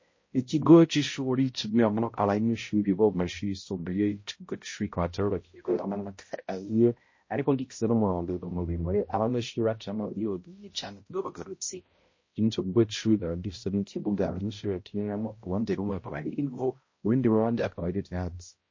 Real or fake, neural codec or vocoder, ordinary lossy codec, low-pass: fake; codec, 16 kHz, 0.5 kbps, X-Codec, HuBERT features, trained on balanced general audio; MP3, 32 kbps; 7.2 kHz